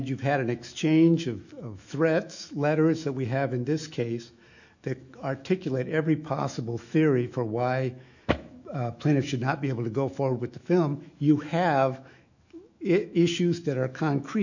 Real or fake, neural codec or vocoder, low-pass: fake; autoencoder, 48 kHz, 128 numbers a frame, DAC-VAE, trained on Japanese speech; 7.2 kHz